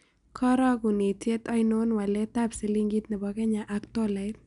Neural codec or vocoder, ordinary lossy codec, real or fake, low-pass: none; none; real; 10.8 kHz